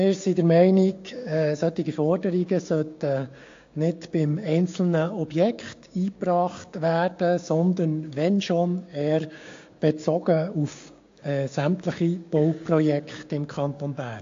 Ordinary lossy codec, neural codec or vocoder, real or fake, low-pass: AAC, 48 kbps; codec, 16 kHz, 6 kbps, DAC; fake; 7.2 kHz